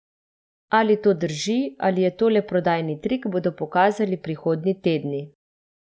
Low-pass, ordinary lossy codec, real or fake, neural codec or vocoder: none; none; real; none